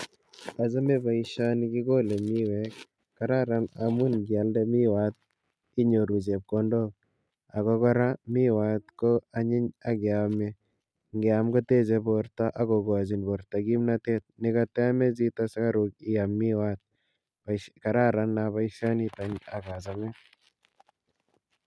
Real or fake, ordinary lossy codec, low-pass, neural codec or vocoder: real; none; none; none